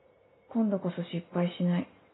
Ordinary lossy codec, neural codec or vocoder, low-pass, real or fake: AAC, 16 kbps; none; 7.2 kHz; real